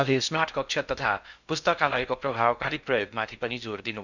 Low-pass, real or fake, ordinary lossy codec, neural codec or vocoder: 7.2 kHz; fake; none; codec, 16 kHz in and 24 kHz out, 0.6 kbps, FocalCodec, streaming, 2048 codes